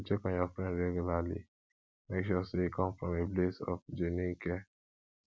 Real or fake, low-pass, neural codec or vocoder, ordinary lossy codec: real; none; none; none